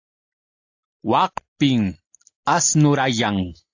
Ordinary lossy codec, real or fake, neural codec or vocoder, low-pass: AAC, 48 kbps; real; none; 7.2 kHz